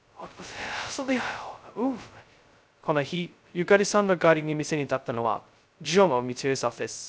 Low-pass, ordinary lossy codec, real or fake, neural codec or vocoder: none; none; fake; codec, 16 kHz, 0.2 kbps, FocalCodec